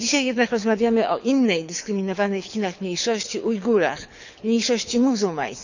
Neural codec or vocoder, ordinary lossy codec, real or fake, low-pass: codec, 24 kHz, 6 kbps, HILCodec; none; fake; 7.2 kHz